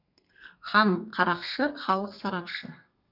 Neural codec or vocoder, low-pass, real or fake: codec, 32 kHz, 1.9 kbps, SNAC; 5.4 kHz; fake